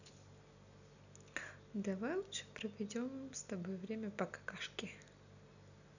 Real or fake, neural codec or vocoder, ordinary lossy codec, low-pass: real; none; none; 7.2 kHz